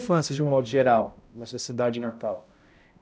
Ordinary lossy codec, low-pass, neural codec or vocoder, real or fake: none; none; codec, 16 kHz, 0.5 kbps, X-Codec, HuBERT features, trained on balanced general audio; fake